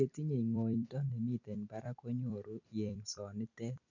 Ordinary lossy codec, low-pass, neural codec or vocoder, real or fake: none; 7.2 kHz; vocoder, 44.1 kHz, 128 mel bands every 256 samples, BigVGAN v2; fake